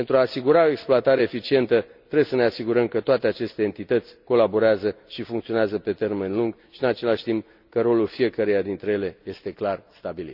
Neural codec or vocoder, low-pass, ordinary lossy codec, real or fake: none; 5.4 kHz; none; real